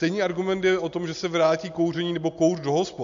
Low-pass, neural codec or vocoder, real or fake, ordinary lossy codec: 7.2 kHz; none; real; AAC, 64 kbps